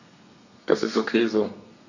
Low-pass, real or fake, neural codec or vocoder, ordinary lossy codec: 7.2 kHz; fake; codec, 32 kHz, 1.9 kbps, SNAC; none